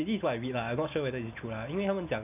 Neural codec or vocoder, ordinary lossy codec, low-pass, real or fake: none; none; 3.6 kHz; real